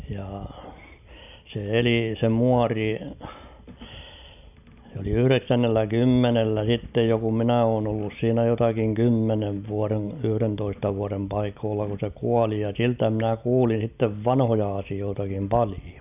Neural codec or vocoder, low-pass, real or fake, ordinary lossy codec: none; 3.6 kHz; real; none